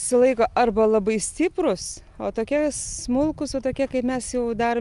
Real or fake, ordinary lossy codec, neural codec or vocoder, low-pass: real; Opus, 32 kbps; none; 10.8 kHz